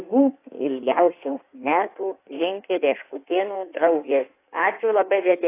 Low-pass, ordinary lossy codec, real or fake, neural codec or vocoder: 3.6 kHz; AAC, 24 kbps; fake; codec, 16 kHz in and 24 kHz out, 1.1 kbps, FireRedTTS-2 codec